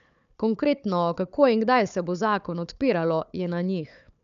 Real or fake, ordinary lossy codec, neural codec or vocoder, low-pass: fake; none; codec, 16 kHz, 4 kbps, FunCodec, trained on Chinese and English, 50 frames a second; 7.2 kHz